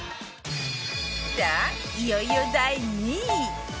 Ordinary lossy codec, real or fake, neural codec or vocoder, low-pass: none; real; none; none